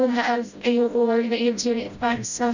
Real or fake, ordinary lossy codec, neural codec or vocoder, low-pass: fake; none; codec, 16 kHz, 0.5 kbps, FreqCodec, smaller model; 7.2 kHz